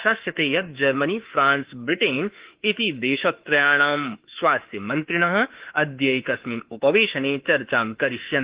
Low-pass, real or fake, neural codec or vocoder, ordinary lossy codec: 3.6 kHz; fake; autoencoder, 48 kHz, 32 numbers a frame, DAC-VAE, trained on Japanese speech; Opus, 16 kbps